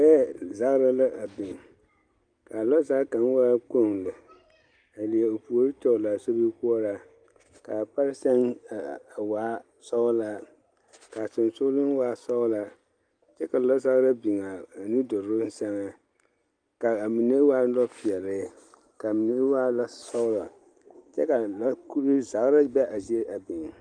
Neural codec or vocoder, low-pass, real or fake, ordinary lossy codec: none; 9.9 kHz; real; Opus, 32 kbps